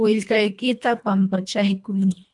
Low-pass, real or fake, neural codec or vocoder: 10.8 kHz; fake; codec, 24 kHz, 1.5 kbps, HILCodec